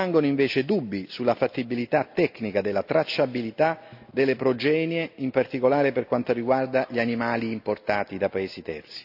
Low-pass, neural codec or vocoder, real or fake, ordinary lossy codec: 5.4 kHz; none; real; AAC, 48 kbps